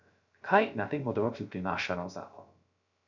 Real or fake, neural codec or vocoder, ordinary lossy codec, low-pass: fake; codec, 16 kHz, 0.3 kbps, FocalCodec; none; 7.2 kHz